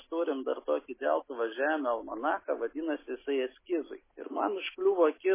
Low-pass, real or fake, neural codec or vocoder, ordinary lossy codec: 3.6 kHz; real; none; MP3, 16 kbps